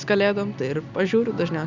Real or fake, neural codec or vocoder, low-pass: real; none; 7.2 kHz